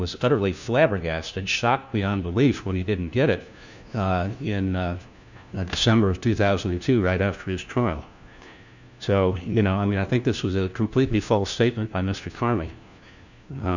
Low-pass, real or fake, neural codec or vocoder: 7.2 kHz; fake; codec, 16 kHz, 1 kbps, FunCodec, trained on LibriTTS, 50 frames a second